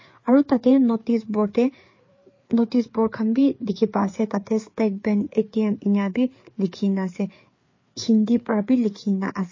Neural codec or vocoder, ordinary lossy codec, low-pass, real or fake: codec, 16 kHz, 4 kbps, FreqCodec, larger model; MP3, 32 kbps; 7.2 kHz; fake